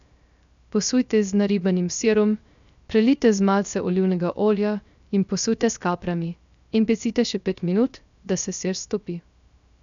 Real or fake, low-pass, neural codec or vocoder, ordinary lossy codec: fake; 7.2 kHz; codec, 16 kHz, 0.3 kbps, FocalCodec; none